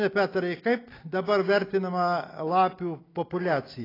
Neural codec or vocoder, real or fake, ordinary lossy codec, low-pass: none; real; AAC, 24 kbps; 5.4 kHz